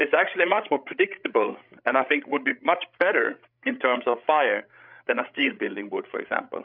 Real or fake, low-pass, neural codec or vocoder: fake; 5.4 kHz; codec, 16 kHz, 16 kbps, FreqCodec, larger model